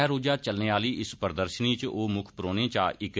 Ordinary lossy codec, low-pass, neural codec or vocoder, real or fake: none; none; none; real